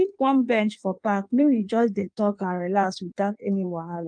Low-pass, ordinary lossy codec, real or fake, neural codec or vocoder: 9.9 kHz; Opus, 32 kbps; fake; codec, 16 kHz in and 24 kHz out, 1.1 kbps, FireRedTTS-2 codec